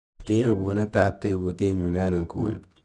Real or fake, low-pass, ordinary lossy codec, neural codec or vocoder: fake; 10.8 kHz; none; codec, 24 kHz, 0.9 kbps, WavTokenizer, medium music audio release